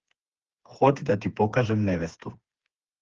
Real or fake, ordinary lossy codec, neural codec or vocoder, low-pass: fake; Opus, 16 kbps; codec, 16 kHz, 4 kbps, FreqCodec, smaller model; 7.2 kHz